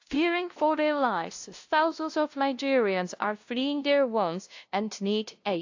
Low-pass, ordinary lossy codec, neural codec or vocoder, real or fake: 7.2 kHz; AAC, 48 kbps; codec, 16 kHz, 0.5 kbps, FunCodec, trained on LibriTTS, 25 frames a second; fake